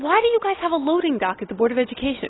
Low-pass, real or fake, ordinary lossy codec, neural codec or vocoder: 7.2 kHz; real; AAC, 16 kbps; none